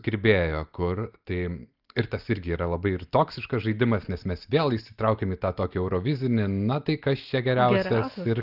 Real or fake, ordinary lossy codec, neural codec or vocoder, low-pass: real; Opus, 24 kbps; none; 5.4 kHz